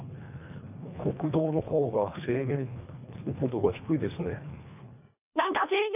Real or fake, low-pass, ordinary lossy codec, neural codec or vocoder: fake; 3.6 kHz; none; codec, 24 kHz, 1.5 kbps, HILCodec